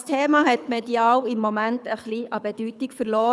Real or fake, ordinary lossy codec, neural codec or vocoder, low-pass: fake; none; codec, 24 kHz, 6 kbps, HILCodec; none